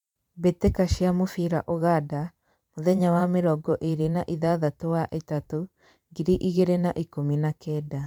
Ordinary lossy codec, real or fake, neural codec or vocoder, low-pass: MP3, 96 kbps; fake; vocoder, 44.1 kHz, 128 mel bands every 512 samples, BigVGAN v2; 19.8 kHz